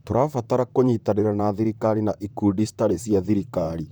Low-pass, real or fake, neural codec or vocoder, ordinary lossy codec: none; fake; vocoder, 44.1 kHz, 128 mel bands, Pupu-Vocoder; none